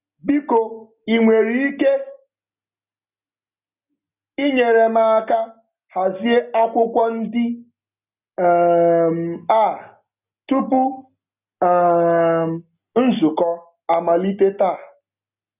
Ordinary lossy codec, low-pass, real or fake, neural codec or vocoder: none; 3.6 kHz; real; none